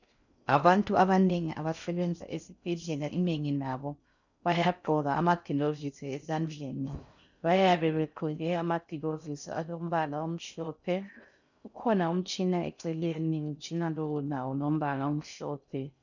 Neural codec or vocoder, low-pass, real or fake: codec, 16 kHz in and 24 kHz out, 0.6 kbps, FocalCodec, streaming, 4096 codes; 7.2 kHz; fake